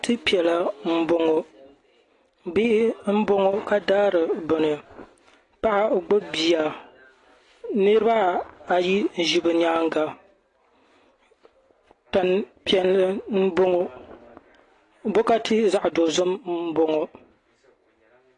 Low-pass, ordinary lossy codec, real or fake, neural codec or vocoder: 10.8 kHz; AAC, 32 kbps; real; none